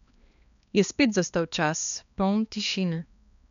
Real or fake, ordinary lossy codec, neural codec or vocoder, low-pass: fake; MP3, 96 kbps; codec, 16 kHz, 2 kbps, X-Codec, HuBERT features, trained on balanced general audio; 7.2 kHz